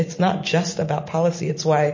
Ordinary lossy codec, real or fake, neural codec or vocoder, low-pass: MP3, 32 kbps; real; none; 7.2 kHz